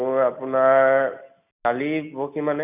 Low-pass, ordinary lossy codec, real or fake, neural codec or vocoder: 3.6 kHz; none; real; none